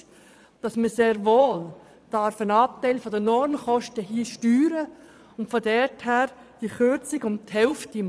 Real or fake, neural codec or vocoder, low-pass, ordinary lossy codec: fake; vocoder, 22.05 kHz, 80 mel bands, Vocos; none; none